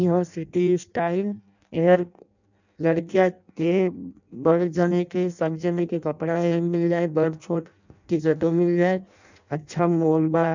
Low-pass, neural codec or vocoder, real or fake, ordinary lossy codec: 7.2 kHz; codec, 16 kHz in and 24 kHz out, 0.6 kbps, FireRedTTS-2 codec; fake; none